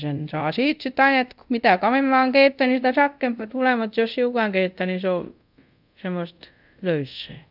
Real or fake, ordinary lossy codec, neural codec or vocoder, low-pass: fake; none; codec, 24 kHz, 0.5 kbps, DualCodec; 5.4 kHz